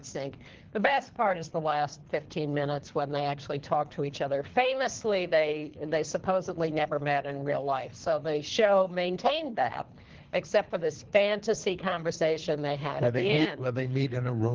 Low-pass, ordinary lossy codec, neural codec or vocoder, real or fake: 7.2 kHz; Opus, 16 kbps; codec, 24 kHz, 3 kbps, HILCodec; fake